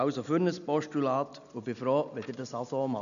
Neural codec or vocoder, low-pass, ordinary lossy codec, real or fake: none; 7.2 kHz; none; real